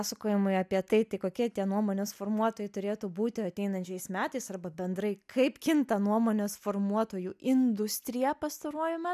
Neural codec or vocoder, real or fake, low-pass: none; real; 14.4 kHz